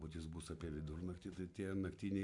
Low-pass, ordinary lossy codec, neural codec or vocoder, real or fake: 10.8 kHz; MP3, 96 kbps; none; real